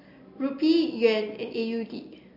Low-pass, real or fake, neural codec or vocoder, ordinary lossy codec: 5.4 kHz; real; none; MP3, 32 kbps